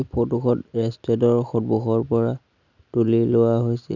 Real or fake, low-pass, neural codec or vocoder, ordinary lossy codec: real; 7.2 kHz; none; none